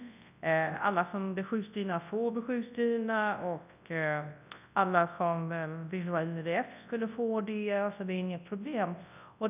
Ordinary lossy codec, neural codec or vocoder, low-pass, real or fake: none; codec, 24 kHz, 0.9 kbps, WavTokenizer, large speech release; 3.6 kHz; fake